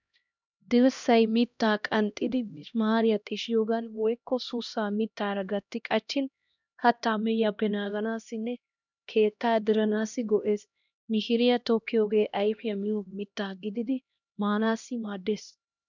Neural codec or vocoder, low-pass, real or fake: codec, 16 kHz, 1 kbps, X-Codec, HuBERT features, trained on LibriSpeech; 7.2 kHz; fake